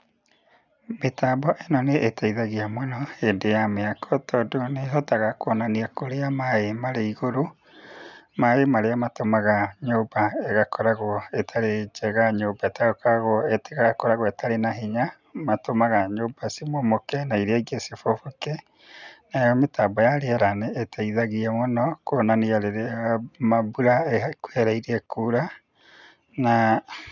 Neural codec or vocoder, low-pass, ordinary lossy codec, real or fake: none; 7.2 kHz; none; real